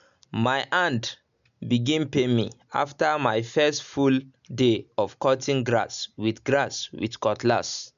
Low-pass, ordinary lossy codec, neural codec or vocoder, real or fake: 7.2 kHz; none; none; real